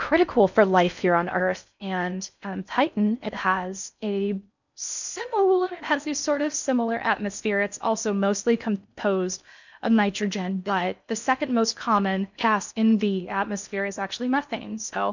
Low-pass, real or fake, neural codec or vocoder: 7.2 kHz; fake; codec, 16 kHz in and 24 kHz out, 0.6 kbps, FocalCodec, streaming, 4096 codes